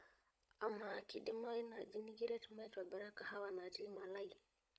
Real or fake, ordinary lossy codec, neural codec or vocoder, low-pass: fake; none; codec, 16 kHz, 16 kbps, FunCodec, trained on LibriTTS, 50 frames a second; none